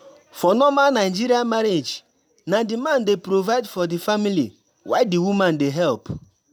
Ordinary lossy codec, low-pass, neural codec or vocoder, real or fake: none; none; none; real